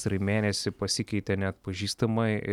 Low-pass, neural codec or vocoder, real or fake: 19.8 kHz; none; real